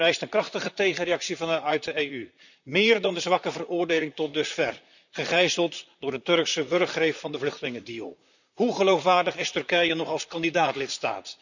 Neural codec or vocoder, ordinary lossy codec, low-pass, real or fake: vocoder, 44.1 kHz, 128 mel bands, Pupu-Vocoder; none; 7.2 kHz; fake